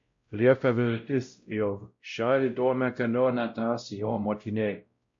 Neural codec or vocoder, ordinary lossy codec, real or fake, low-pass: codec, 16 kHz, 0.5 kbps, X-Codec, WavLM features, trained on Multilingual LibriSpeech; MP3, 48 kbps; fake; 7.2 kHz